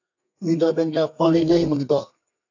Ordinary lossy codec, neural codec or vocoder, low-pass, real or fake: AAC, 48 kbps; codec, 32 kHz, 1.9 kbps, SNAC; 7.2 kHz; fake